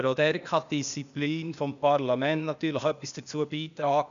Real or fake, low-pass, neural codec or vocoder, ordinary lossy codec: fake; 7.2 kHz; codec, 16 kHz, 0.8 kbps, ZipCodec; none